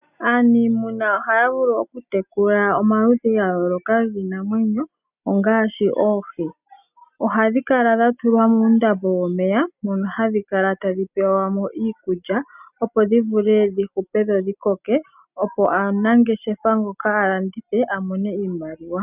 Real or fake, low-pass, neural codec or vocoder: real; 3.6 kHz; none